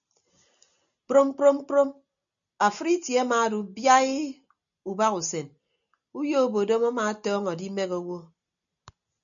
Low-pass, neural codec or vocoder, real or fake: 7.2 kHz; none; real